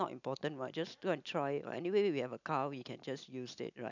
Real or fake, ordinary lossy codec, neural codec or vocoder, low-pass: real; none; none; 7.2 kHz